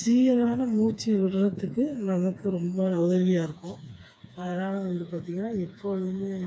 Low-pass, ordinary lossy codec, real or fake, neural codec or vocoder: none; none; fake; codec, 16 kHz, 4 kbps, FreqCodec, smaller model